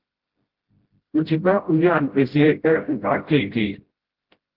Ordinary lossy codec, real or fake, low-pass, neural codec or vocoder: Opus, 16 kbps; fake; 5.4 kHz; codec, 16 kHz, 0.5 kbps, FreqCodec, smaller model